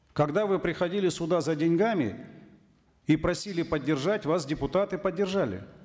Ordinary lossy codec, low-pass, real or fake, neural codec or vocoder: none; none; real; none